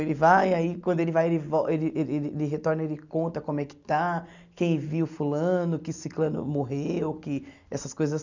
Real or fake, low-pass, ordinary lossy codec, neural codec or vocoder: real; 7.2 kHz; none; none